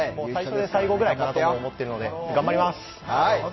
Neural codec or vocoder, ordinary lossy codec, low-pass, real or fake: none; MP3, 24 kbps; 7.2 kHz; real